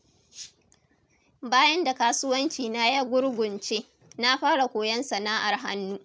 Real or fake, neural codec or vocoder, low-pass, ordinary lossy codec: real; none; none; none